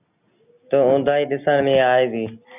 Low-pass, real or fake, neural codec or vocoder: 3.6 kHz; real; none